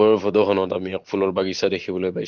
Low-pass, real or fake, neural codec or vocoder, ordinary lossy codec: 7.2 kHz; real; none; Opus, 16 kbps